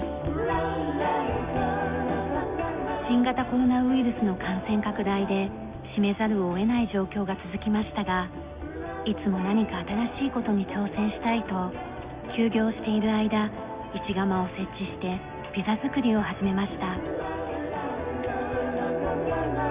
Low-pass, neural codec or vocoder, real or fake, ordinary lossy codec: 3.6 kHz; none; real; Opus, 64 kbps